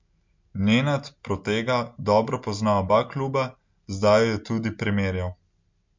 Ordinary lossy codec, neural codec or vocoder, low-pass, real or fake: MP3, 64 kbps; none; 7.2 kHz; real